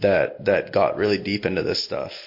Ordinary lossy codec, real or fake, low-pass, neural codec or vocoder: MP3, 32 kbps; real; 5.4 kHz; none